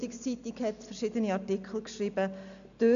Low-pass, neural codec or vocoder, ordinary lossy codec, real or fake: 7.2 kHz; none; none; real